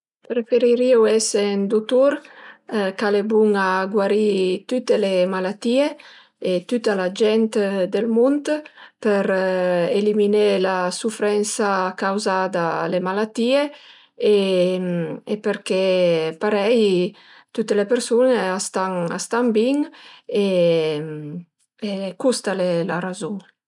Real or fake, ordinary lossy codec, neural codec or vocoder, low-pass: real; none; none; 10.8 kHz